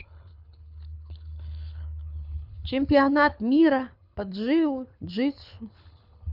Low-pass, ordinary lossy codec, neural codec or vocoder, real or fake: 5.4 kHz; none; codec, 24 kHz, 6 kbps, HILCodec; fake